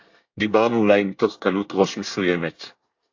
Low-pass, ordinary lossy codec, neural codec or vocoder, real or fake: 7.2 kHz; AAC, 48 kbps; codec, 24 kHz, 1 kbps, SNAC; fake